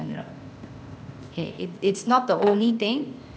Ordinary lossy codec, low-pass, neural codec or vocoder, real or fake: none; none; codec, 16 kHz, 0.8 kbps, ZipCodec; fake